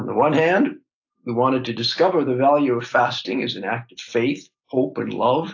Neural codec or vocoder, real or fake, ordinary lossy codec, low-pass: none; real; AAC, 48 kbps; 7.2 kHz